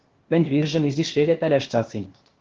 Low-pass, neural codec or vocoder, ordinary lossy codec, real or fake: 7.2 kHz; codec, 16 kHz, 0.8 kbps, ZipCodec; Opus, 16 kbps; fake